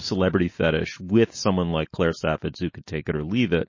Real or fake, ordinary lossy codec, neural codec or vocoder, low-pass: real; MP3, 32 kbps; none; 7.2 kHz